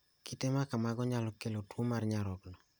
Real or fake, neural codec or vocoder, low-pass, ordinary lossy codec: real; none; none; none